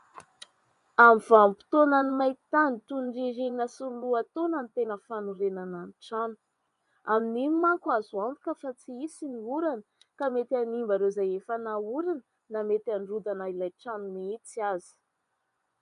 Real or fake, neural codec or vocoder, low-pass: fake; vocoder, 24 kHz, 100 mel bands, Vocos; 10.8 kHz